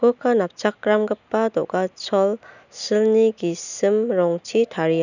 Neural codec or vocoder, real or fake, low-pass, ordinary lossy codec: none; real; 7.2 kHz; none